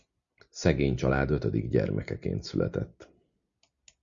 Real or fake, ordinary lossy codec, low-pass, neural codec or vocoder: real; Opus, 64 kbps; 7.2 kHz; none